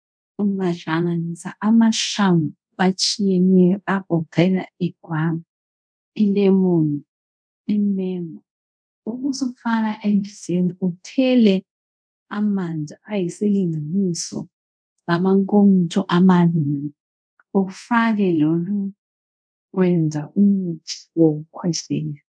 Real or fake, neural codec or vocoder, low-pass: fake; codec, 24 kHz, 0.5 kbps, DualCodec; 9.9 kHz